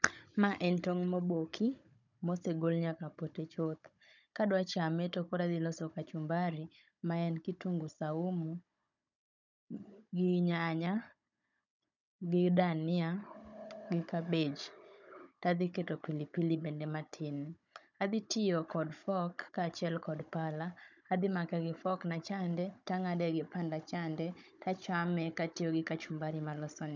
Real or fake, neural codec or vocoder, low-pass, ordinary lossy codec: fake; codec, 16 kHz, 16 kbps, FunCodec, trained on Chinese and English, 50 frames a second; 7.2 kHz; none